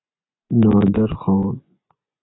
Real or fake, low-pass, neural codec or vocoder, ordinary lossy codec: fake; 7.2 kHz; vocoder, 24 kHz, 100 mel bands, Vocos; AAC, 16 kbps